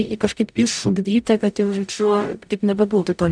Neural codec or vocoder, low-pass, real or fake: codec, 44.1 kHz, 0.9 kbps, DAC; 9.9 kHz; fake